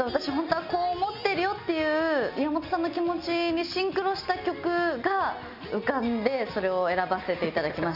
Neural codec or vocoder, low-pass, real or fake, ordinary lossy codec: none; 5.4 kHz; real; none